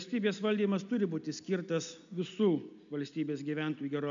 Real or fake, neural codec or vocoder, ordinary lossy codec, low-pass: real; none; MP3, 64 kbps; 7.2 kHz